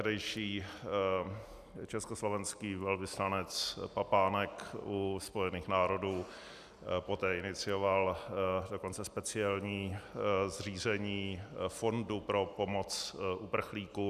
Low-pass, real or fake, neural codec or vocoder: 14.4 kHz; real; none